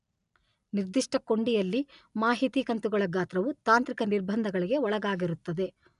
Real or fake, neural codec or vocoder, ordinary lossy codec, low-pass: real; none; none; 10.8 kHz